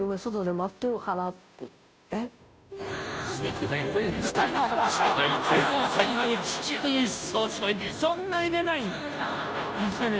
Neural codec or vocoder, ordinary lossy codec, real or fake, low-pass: codec, 16 kHz, 0.5 kbps, FunCodec, trained on Chinese and English, 25 frames a second; none; fake; none